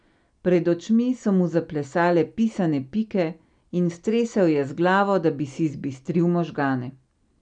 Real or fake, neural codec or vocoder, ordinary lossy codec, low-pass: real; none; none; 9.9 kHz